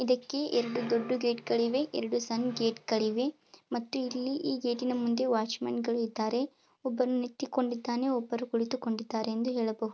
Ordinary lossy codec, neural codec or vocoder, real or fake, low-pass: none; none; real; none